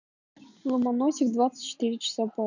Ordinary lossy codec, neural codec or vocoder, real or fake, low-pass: none; none; real; 7.2 kHz